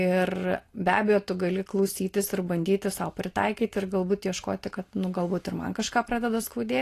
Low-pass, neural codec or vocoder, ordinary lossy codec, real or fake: 14.4 kHz; none; AAC, 48 kbps; real